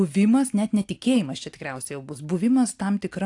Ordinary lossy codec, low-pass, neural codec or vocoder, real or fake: AAC, 64 kbps; 10.8 kHz; none; real